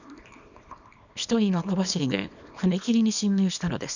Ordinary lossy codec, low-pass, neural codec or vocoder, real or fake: none; 7.2 kHz; codec, 24 kHz, 0.9 kbps, WavTokenizer, small release; fake